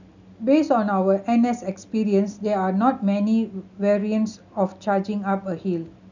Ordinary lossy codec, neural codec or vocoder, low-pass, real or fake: none; none; 7.2 kHz; real